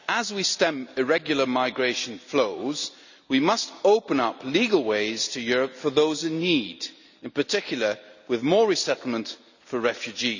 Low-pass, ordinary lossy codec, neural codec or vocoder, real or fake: 7.2 kHz; none; none; real